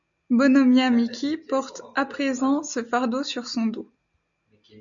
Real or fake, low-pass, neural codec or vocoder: real; 7.2 kHz; none